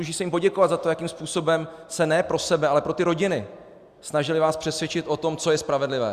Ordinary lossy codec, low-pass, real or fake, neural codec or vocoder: Opus, 64 kbps; 14.4 kHz; real; none